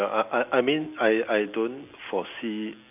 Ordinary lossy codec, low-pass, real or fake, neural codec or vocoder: none; 3.6 kHz; real; none